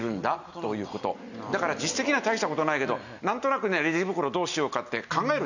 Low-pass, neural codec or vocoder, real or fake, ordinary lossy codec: 7.2 kHz; none; real; none